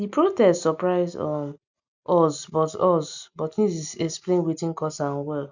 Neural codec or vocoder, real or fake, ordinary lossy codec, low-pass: none; real; none; 7.2 kHz